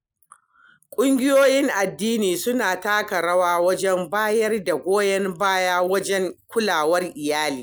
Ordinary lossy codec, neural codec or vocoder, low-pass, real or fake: none; none; none; real